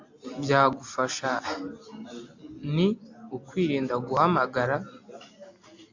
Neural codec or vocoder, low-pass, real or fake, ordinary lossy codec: none; 7.2 kHz; real; AAC, 48 kbps